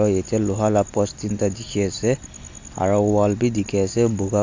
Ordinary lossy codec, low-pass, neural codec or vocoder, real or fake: AAC, 48 kbps; 7.2 kHz; none; real